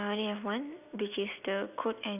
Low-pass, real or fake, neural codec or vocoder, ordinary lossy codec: 3.6 kHz; real; none; none